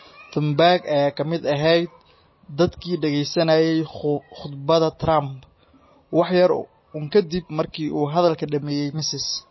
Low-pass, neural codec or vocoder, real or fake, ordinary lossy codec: 7.2 kHz; none; real; MP3, 24 kbps